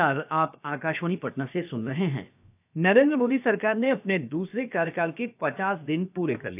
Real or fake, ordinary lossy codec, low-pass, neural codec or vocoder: fake; AAC, 32 kbps; 3.6 kHz; codec, 16 kHz, about 1 kbps, DyCAST, with the encoder's durations